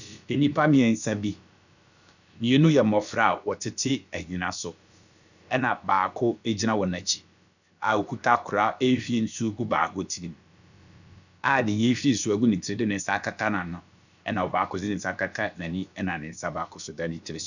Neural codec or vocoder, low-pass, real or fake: codec, 16 kHz, about 1 kbps, DyCAST, with the encoder's durations; 7.2 kHz; fake